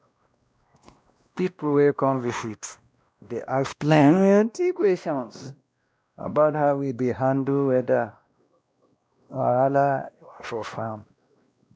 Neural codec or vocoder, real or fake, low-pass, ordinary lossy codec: codec, 16 kHz, 1 kbps, X-Codec, WavLM features, trained on Multilingual LibriSpeech; fake; none; none